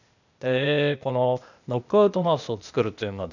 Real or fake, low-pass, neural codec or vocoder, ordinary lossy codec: fake; 7.2 kHz; codec, 16 kHz, 0.8 kbps, ZipCodec; none